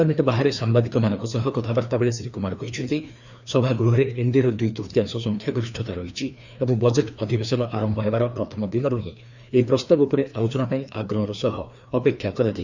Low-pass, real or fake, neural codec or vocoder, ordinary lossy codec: 7.2 kHz; fake; codec, 16 kHz, 2 kbps, FreqCodec, larger model; none